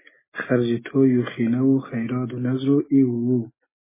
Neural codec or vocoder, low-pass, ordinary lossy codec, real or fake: none; 3.6 kHz; MP3, 16 kbps; real